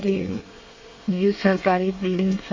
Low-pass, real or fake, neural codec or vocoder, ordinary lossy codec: 7.2 kHz; fake; codec, 24 kHz, 1 kbps, SNAC; MP3, 32 kbps